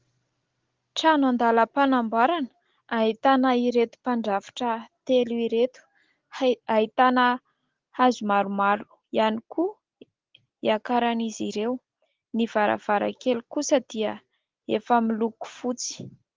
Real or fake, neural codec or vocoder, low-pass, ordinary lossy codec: real; none; 7.2 kHz; Opus, 16 kbps